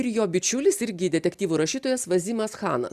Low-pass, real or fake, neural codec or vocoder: 14.4 kHz; real; none